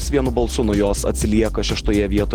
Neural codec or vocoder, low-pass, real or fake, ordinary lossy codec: none; 19.8 kHz; real; Opus, 16 kbps